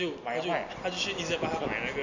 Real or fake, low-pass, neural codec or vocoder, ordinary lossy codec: real; 7.2 kHz; none; none